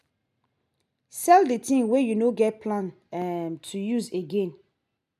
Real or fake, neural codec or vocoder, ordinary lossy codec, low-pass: real; none; none; 14.4 kHz